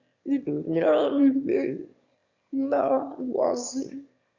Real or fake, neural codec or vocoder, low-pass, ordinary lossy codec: fake; autoencoder, 22.05 kHz, a latent of 192 numbers a frame, VITS, trained on one speaker; 7.2 kHz; Opus, 64 kbps